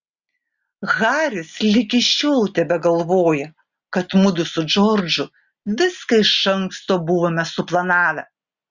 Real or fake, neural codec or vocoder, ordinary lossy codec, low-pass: real; none; Opus, 64 kbps; 7.2 kHz